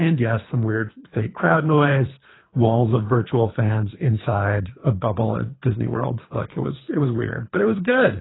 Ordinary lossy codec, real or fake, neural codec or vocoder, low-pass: AAC, 16 kbps; fake; codec, 24 kHz, 3 kbps, HILCodec; 7.2 kHz